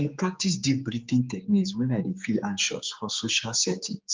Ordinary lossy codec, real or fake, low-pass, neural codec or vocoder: Opus, 32 kbps; fake; 7.2 kHz; codec, 16 kHz, 4 kbps, X-Codec, HuBERT features, trained on general audio